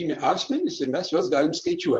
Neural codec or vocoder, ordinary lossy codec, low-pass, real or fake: vocoder, 44.1 kHz, 128 mel bands, Pupu-Vocoder; Opus, 64 kbps; 10.8 kHz; fake